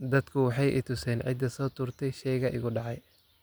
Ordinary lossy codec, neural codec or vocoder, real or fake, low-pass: none; none; real; none